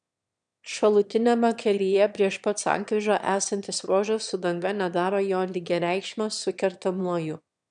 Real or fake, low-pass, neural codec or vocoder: fake; 9.9 kHz; autoencoder, 22.05 kHz, a latent of 192 numbers a frame, VITS, trained on one speaker